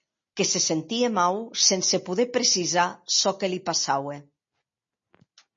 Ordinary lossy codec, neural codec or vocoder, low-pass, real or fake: MP3, 32 kbps; none; 7.2 kHz; real